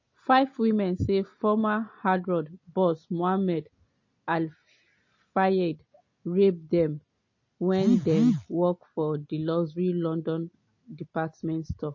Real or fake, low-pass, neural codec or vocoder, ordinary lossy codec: real; 7.2 kHz; none; MP3, 32 kbps